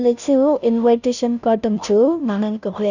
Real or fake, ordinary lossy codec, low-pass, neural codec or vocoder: fake; none; 7.2 kHz; codec, 16 kHz, 0.5 kbps, FunCodec, trained on Chinese and English, 25 frames a second